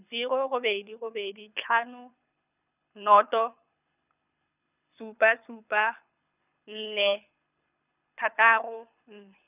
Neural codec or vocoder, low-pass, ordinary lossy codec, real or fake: codec, 24 kHz, 6 kbps, HILCodec; 3.6 kHz; none; fake